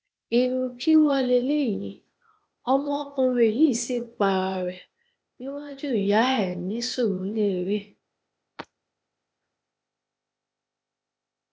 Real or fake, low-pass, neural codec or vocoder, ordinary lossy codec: fake; none; codec, 16 kHz, 0.8 kbps, ZipCodec; none